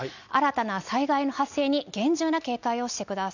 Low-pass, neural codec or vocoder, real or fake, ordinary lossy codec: 7.2 kHz; none; real; none